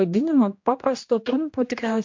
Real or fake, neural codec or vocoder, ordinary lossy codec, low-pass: fake; codec, 16 kHz, 1 kbps, X-Codec, HuBERT features, trained on general audio; MP3, 48 kbps; 7.2 kHz